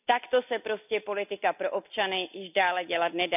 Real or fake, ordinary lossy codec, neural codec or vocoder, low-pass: real; none; none; 3.6 kHz